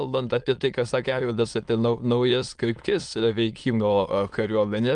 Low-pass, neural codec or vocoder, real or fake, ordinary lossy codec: 9.9 kHz; autoencoder, 22.05 kHz, a latent of 192 numbers a frame, VITS, trained on many speakers; fake; Opus, 32 kbps